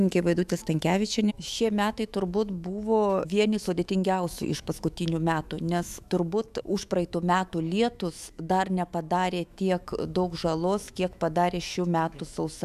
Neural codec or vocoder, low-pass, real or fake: autoencoder, 48 kHz, 128 numbers a frame, DAC-VAE, trained on Japanese speech; 14.4 kHz; fake